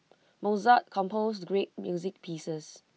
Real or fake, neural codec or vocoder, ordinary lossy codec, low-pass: real; none; none; none